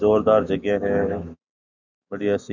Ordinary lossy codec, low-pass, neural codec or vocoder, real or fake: none; 7.2 kHz; autoencoder, 48 kHz, 128 numbers a frame, DAC-VAE, trained on Japanese speech; fake